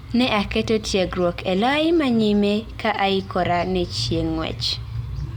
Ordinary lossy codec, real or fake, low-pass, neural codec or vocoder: Opus, 64 kbps; real; 19.8 kHz; none